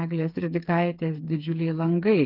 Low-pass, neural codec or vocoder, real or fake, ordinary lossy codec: 5.4 kHz; codec, 16 kHz, 4 kbps, FreqCodec, smaller model; fake; Opus, 32 kbps